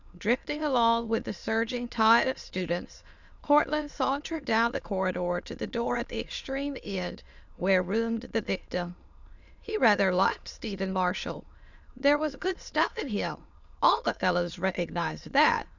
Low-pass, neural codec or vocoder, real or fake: 7.2 kHz; autoencoder, 22.05 kHz, a latent of 192 numbers a frame, VITS, trained on many speakers; fake